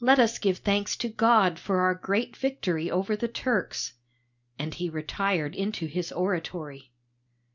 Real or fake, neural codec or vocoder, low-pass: real; none; 7.2 kHz